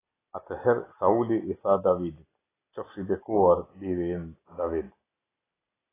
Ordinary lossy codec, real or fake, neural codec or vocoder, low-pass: AAC, 16 kbps; real; none; 3.6 kHz